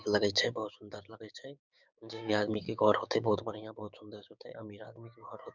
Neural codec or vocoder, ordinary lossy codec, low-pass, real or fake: codec, 16 kHz, 6 kbps, DAC; none; 7.2 kHz; fake